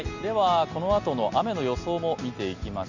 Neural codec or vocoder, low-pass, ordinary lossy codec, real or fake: none; 7.2 kHz; none; real